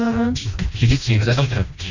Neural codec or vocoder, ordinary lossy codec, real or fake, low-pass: codec, 16 kHz, 1 kbps, FreqCodec, smaller model; none; fake; 7.2 kHz